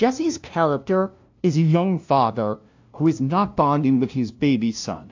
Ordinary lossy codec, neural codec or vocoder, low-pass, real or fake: AAC, 48 kbps; codec, 16 kHz, 0.5 kbps, FunCodec, trained on LibriTTS, 25 frames a second; 7.2 kHz; fake